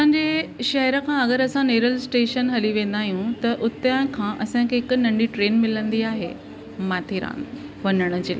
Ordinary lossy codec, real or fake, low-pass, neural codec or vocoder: none; real; none; none